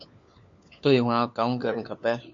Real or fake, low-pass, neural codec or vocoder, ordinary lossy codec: fake; 7.2 kHz; codec, 16 kHz, 4 kbps, FunCodec, trained on LibriTTS, 50 frames a second; MP3, 64 kbps